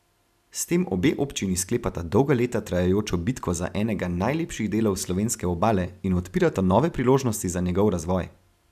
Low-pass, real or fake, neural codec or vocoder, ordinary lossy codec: 14.4 kHz; real; none; none